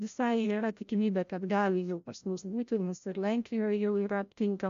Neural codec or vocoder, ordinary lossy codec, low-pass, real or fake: codec, 16 kHz, 0.5 kbps, FreqCodec, larger model; MP3, 64 kbps; 7.2 kHz; fake